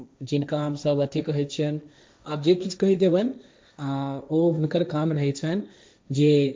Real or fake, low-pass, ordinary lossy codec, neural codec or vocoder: fake; none; none; codec, 16 kHz, 1.1 kbps, Voila-Tokenizer